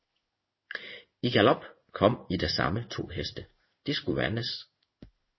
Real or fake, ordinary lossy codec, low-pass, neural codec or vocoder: fake; MP3, 24 kbps; 7.2 kHz; codec, 16 kHz in and 24 kHz out, 1 kbps, XY-Tokenizer